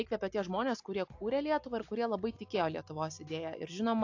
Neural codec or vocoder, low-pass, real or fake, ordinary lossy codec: none; 7.2 kHz; real; AAC, 48 kbps